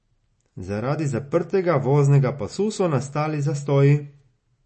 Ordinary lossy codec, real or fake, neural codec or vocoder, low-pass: MP3, 32 kbps; real; none; 10.8 kHz